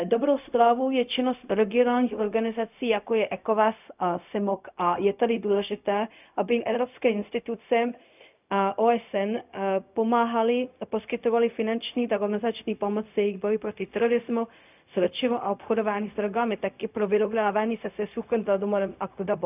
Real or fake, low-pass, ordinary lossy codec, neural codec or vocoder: fake; 3.6 kHz; none; codec, 16 kHz, 0.4 kbps, LongCat-Audio-Codec